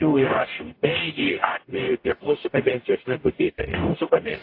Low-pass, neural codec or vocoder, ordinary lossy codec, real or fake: 5.4 kHz; codec, 44.1 kHz, 0.9 kbps, DAC; AAC, 32 kbps; fake